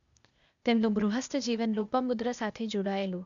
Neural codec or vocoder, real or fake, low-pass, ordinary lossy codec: codec, 16 kHz, 0.8 kbps, ZipCodec; fake; 7.2 kHz; MP3, 96 kbps